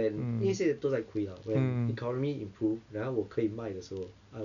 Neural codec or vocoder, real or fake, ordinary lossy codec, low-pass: none; real; none; 7.2 kHz